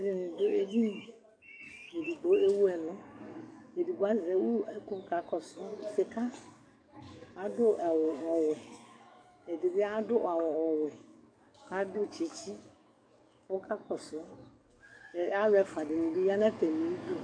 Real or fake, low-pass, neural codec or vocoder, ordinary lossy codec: fake; 9.9 kHz; codec, 44.1 kHz, 7.8 kbps, DAC; AAC, 64 kbps